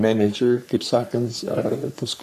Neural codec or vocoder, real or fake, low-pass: codec, 44.1 kHz, 3.4 kbps, Pupu-Codec; fake; 14.4 kHz